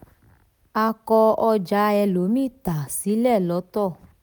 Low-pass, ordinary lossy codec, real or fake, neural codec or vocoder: none; none; real; none